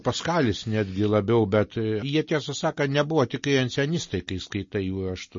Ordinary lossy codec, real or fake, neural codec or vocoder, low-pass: MP3, 32 kbps; real; none; 7.2 kHz